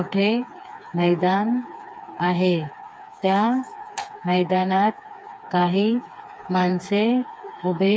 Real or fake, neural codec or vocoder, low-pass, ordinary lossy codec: fake; codec, 16 kHz, 4 kbps, FreqCodec, smaller model; none; none